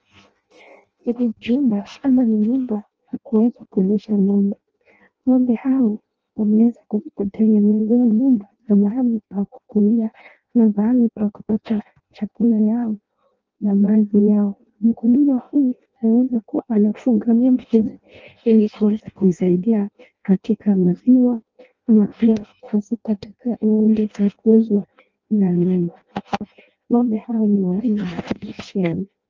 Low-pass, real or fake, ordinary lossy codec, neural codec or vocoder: 7.2 kHz; fake; Opus, 24 kbps; codec, 16 kHz in and 24 kHz out, 0.6 kbps, FireRedTTS-2 codec